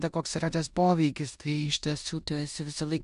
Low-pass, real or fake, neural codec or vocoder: 10.8 kHz; fake; codec, 16 kHz in and 24 kHz out, 0.9 kbps, LongCat-Audio-Codec, four codebook decoder